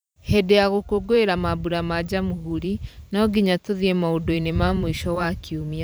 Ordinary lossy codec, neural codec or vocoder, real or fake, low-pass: none; vocoder, 44.1 kHz, 128 mel bands every 512 samples, BigVGAN v2; fake; none